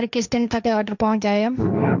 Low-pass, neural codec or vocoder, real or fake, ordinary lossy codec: 7.2 kHz; codec, 16 kHz, 1.1 kbps, Voila-Tokenizer; fake; none